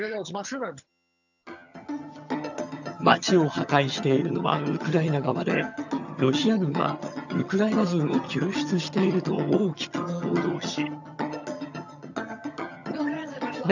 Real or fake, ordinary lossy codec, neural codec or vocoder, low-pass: fake; none; vocoder, 22.05 kHz, 80 mel bands, HiFi-GAN; 7.2 kHz